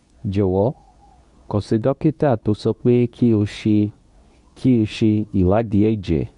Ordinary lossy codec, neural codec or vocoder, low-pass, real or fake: none; codec, 24 kHz, 0.9 kbps, WavTokenizer, medium speech release version 2; 10.8 kHz; fake